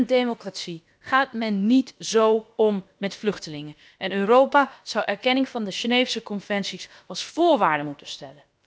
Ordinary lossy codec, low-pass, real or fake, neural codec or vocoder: none; none; fake; codec, 16 kHz, about 1 kbps, DyCAST, with the encoder's durations